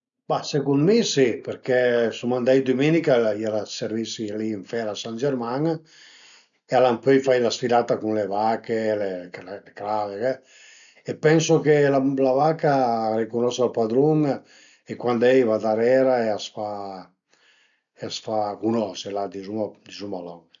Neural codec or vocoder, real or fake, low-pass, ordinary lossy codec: none; real; 7.2 kHz; none